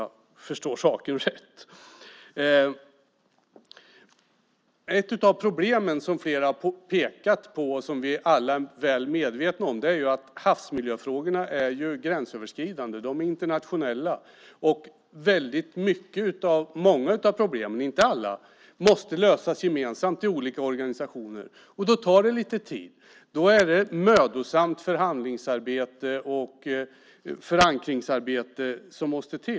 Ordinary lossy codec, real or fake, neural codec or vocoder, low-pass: none; real; none; none